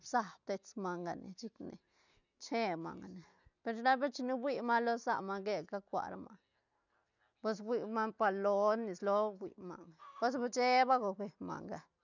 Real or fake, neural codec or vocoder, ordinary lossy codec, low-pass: real; none; none; 7.2 kHz